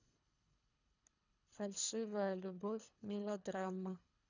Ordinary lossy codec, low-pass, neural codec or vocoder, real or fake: none; 7.2 kHz; codec, 24 kHz, 3 kbps, HILCodec; fake